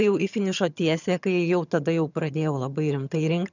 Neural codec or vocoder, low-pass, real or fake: vocoder, 22.05 kHz, 80 mel bands, HiFi-GAN; 7.2 kHz; fake